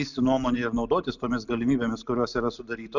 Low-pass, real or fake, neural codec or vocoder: 7.2 kHz; real; none